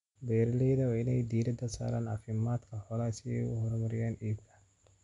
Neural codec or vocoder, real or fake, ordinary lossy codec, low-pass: none; real; none; 10.8 kHz